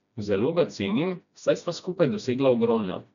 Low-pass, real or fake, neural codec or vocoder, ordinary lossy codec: 7.2 kHz; fake; codec, 16 kHz, 1 kbps, FreqCodec, smaller model; none